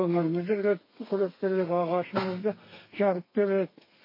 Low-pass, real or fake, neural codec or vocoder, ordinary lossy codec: 5.4 kHz; fake; codec, 32 kHz, 1.9 kbps, SNAC; MP3, 24 kbps